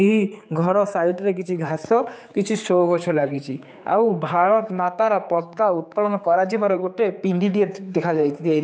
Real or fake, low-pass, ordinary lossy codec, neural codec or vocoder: fake; none; none; codec, 16 kHz, 4 kbps, X-Codec, HuBERT features, trained on general audio